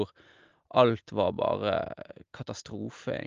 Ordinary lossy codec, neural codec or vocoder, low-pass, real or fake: Opus, 32 kbps; none; 7.2 kHz; real